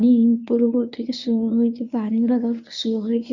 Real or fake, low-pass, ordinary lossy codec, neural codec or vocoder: fake; 7.2 kHz; none; codec, 24 kHz, 0.9 kbps, WavTokenizer, medium speech release version 1